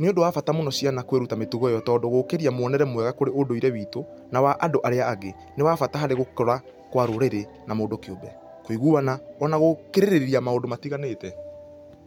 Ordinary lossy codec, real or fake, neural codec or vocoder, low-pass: MP3, 96 kbps; real; none; 19.8 kHz